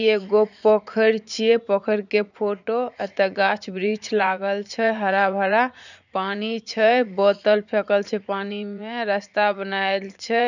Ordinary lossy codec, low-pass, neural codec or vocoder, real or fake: none; 7.2 kHz; vocoder, 44.1 kHz, 80 mel bands, Vocos; fake